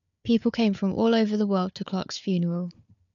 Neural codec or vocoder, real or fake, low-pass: codec, 16 kHz, 4 kbps, FunCodec, trained on Chinese and English, 50 frames a second; fake; 7.2 kHz